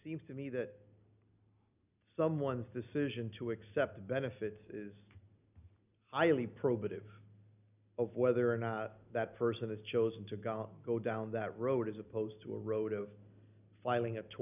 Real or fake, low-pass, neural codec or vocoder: real; 3.6 kHz; none